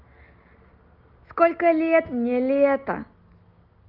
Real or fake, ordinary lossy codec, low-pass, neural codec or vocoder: real; Opus, 24 kbps; 5.4 kHz; none